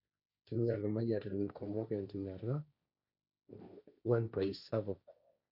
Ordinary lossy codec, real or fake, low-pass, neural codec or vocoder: none; fake; 5.4 kHz; codec, 16 kHz, 1.1 kbps, Voila-Tokenizer